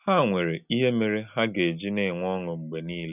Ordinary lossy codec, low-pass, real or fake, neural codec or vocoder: none; 3.6 kHz; real; none